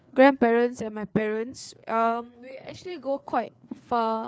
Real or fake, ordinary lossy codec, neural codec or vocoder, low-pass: fake; none; codec, 16 kHz, 4 kbps, FreqCodec, larger model; none